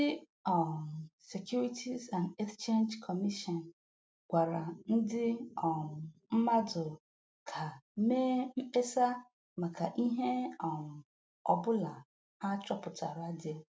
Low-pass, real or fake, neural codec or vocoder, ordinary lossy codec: none; real; none; none